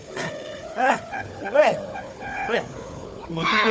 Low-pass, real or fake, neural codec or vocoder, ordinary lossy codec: none; fake; codec, 16 kHz, 4 kbps, FunCodec, trained on Chinese and English, 50 frames a second; none